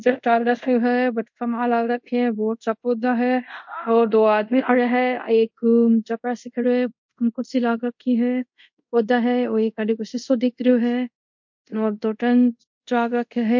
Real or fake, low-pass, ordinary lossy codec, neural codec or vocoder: fake; 7.2 kHz; MP3, 48 kbps; codec, 24 kHz, 0.5 kbps, DualCodec